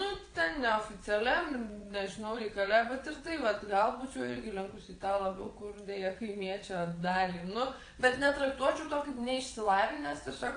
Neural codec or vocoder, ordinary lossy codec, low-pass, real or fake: vocoder, 22.05 kHz, 80 mel bands, WaveNeXt; AAC, 48 kbps; 9.9 kHz; fake